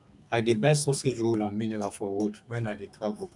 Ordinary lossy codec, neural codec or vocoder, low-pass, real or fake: none; codec, 32 kHz, 1.9 kbps, SNAC; 10.8 kHz; fake